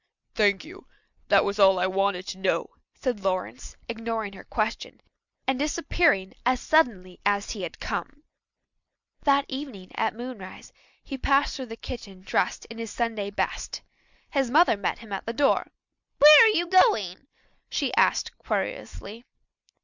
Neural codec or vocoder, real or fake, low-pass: none; real; 7.2 kHz